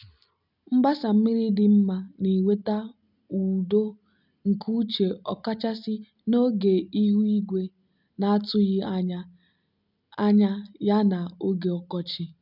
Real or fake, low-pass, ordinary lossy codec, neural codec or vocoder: real; 5.4 kHz; none; none